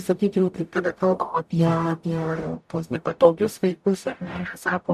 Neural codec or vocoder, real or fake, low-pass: codec, 44.1 kHz, 0.9 kbps, DAC; fake; 14.4 kHz